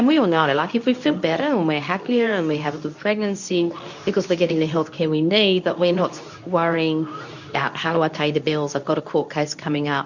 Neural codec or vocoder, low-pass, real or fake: codec, 24 kHz, 0.9 kbps, WavTokenizer, medium speech release version 2; 7.2 kHz; fake